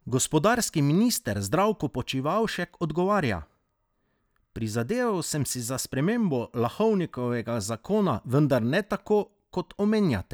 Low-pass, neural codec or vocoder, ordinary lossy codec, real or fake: none; none; none; real